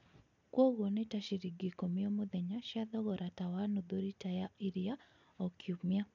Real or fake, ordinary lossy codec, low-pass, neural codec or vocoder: real; none; 7.2 kHz; none